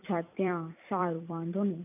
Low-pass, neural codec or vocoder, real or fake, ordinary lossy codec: 3.6 kHz; vocoder, 44.1 kHz, 128 mel bands every 256 samples, BigVGAN v2; fake; none